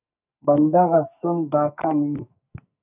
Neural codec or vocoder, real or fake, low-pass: codec, 44.1 kHz, 2.6 kbps, SNAC; fake; 3.6 kHz